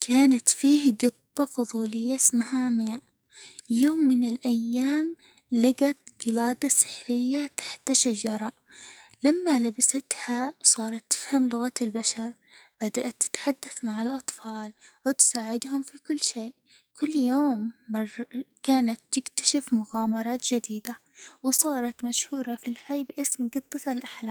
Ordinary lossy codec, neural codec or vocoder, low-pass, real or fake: none; codec, 44.1 kHz, 2.6 kbps, SNAC; none; fake